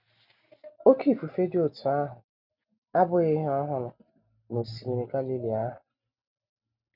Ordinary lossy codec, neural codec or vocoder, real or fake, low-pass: none; none; real; 5.4 kHz